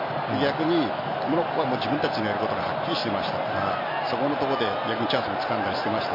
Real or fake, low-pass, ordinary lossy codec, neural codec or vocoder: real; 5.4 kHz; MP3, 32 kbps; none